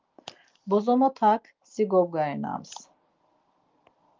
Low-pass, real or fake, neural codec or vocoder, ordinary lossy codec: 7.2 kHz; real; none; Opus, 32 kbps